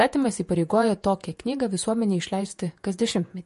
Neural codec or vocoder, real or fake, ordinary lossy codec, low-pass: vocoder, 48 kHz, 128 mel bands, Vocos; fake; MP3, 48 kbps; 14.4 kHz